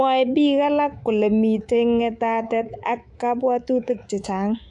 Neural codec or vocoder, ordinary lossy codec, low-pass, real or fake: codec, 24 kHz, 3.1 kbps, DualCodec; AAC, 64 kbps; 10.8 kHz; fake